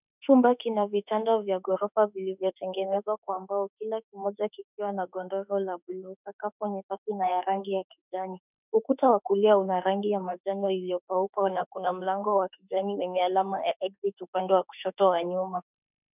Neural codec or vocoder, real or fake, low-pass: autoencoder, 48 kHz, 32 numbers a frame, DAC-VAE, trained on Japanese speech; fake; 3.6 kHz